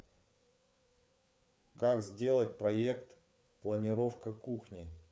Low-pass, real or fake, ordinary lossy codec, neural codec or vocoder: none; fake; none; codec, 16 kHz, 4 kbps, FreqCodec, larger model